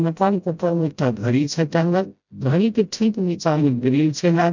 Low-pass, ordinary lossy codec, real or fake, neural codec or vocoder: 7.2 kHz; none; fake; codec, 16 kHz, 0.5 kbps, FreqCodec, smaller model